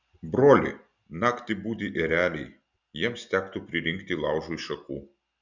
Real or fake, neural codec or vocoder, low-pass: real; none; 7.2 kHz